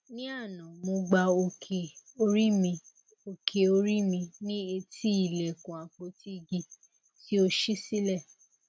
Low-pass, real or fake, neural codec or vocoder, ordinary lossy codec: none; real; none; none